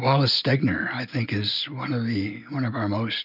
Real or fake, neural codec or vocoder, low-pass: real; none; 5.4 kHz